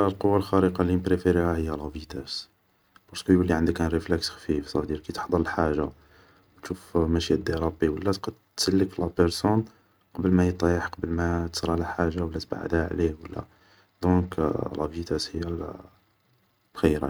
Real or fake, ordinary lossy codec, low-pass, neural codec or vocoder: real; none; none; none